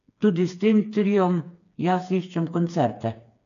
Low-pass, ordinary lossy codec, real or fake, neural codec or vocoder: 7.2 kHz; none; fake; codec, 16 kHz, 4 kbps, FreqCodec, smaller model